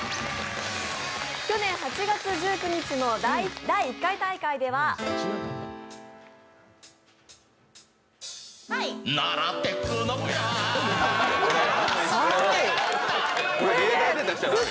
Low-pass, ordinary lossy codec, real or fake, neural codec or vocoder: none; none; real; none